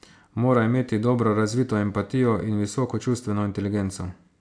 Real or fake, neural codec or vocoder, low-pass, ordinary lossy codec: real; none; 9.9 kHz; AAC, 64 kbps